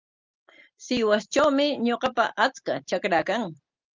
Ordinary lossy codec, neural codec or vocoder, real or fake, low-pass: Opus, 24 kbps; none; real; 7.2 kHz